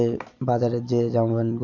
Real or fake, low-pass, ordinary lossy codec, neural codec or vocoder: real; 7.2 kHz; none; none